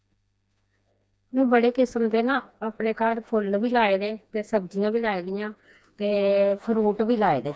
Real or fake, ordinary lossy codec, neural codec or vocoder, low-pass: fake; none; codec, 16 kHz, 2 kbps, FreqCodec, smaller model; none